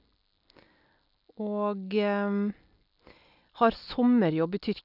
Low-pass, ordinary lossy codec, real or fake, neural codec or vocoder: 5.4 kHz; none; real; none